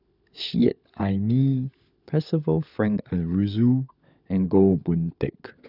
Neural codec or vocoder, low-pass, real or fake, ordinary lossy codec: codec, 16 kHz, 16 kbps, FunCodec, trained on LibriTTS, 50 frames a second; 5.4 kHz; fake; none